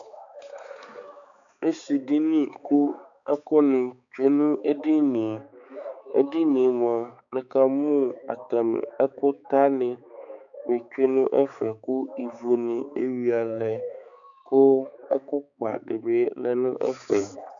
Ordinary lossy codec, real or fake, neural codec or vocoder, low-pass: MP3, 96 kbps; fake; codec, 16 kHz, 4 kbps, X-Codec, HuBERT features, trained on balanced general audio; 7.2 kHz